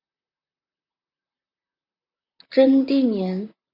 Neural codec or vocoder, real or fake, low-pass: vocoder, 44.1 kHz, 128 mel bands, Pupu-Vocoder; fake; 5.4 kHz